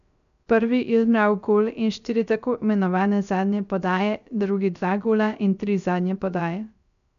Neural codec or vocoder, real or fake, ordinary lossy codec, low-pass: codec, 16 kHz, 0.3 kbps, FocalCodec; fake; none; 7.2 kHz